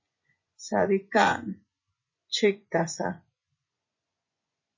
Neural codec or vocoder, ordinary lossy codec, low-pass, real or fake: vocoder, 24 kHz, 100 mel bands, Vocos; MP3, 32 kbps; 7.2 kHz; fake